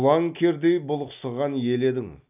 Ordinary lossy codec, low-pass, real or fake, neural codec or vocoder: none; 3.6 kHz; real; none